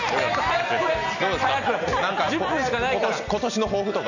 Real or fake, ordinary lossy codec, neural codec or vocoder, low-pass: real; none; none; 7.2 kHz